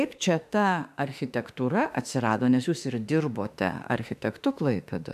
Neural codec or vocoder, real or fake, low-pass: autoencoder, 48 kHz, 32 numbers a frame, DAC-VAE, trained on Japanese speech; fake; 14.4 kHz